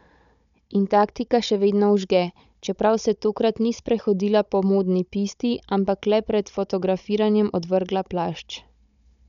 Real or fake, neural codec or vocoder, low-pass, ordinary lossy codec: fake; codec, 16 kHz, 16 kbps, FunCodec, trained on Chinese and English, 50 frames a second; 7.2 kHz; none